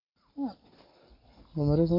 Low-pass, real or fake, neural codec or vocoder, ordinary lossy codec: 5.4 kHz; real; none; MP3, 32 kbps